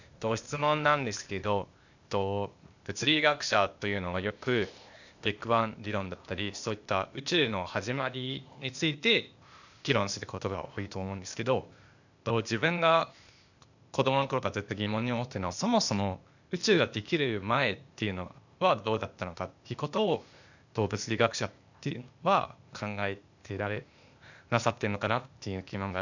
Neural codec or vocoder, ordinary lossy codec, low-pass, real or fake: codec, 16 kHz, 0.8 kbps, ZipCodec; none; 7.2 kHz; fake